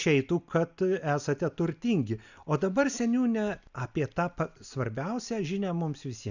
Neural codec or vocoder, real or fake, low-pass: none; real; 7.2 kHz